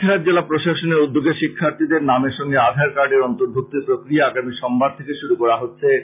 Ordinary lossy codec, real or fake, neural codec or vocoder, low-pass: MP3, 32 kbps; real; none; 3.6 kHz